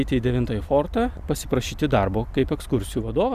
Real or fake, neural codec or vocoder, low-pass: real; none; 14.4 kHz